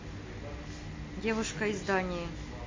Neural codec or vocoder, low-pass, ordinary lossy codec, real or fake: none; 7.2 kHz; MP3, 32 kbps; real